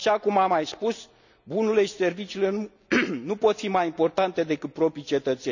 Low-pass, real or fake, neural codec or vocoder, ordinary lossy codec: 7.2 kHz; real; none; none